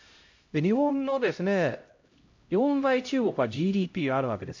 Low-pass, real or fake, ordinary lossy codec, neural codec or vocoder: 7.2 kHz; fake; MP3, 48 kbps; codec, 16 kHz, 0.5 kbps, X-Codec, HuBERT features, trained on LibriSpeech